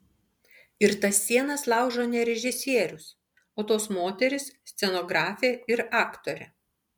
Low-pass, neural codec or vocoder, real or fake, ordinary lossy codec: 19.8 kHz; none; real; MP3, 96 kbps